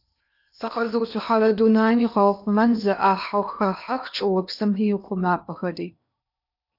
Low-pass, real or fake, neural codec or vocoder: 5.4 kHz; fake; codec, 16 kHz in and 24 kHz out, 0.8 kbps, FocalCodec, streaming, 65536 codes